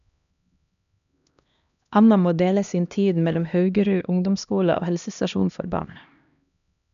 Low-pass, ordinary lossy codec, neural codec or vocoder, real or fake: 7.2 kHz; none; codec, 16 kHz, 1 kbps, X-Codec, HuBERT features, trained on LibriSpeech; fake